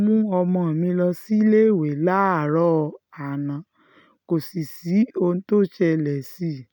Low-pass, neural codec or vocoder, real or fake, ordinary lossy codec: none; none; real; none